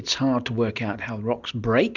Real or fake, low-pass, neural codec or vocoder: real; 7.2 kHz; none